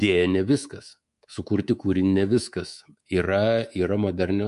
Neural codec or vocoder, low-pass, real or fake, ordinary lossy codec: codec, 24 kHz, 3.1 kbps, DualCodec; 10.8 kHz; fake; MP3, 64 kbps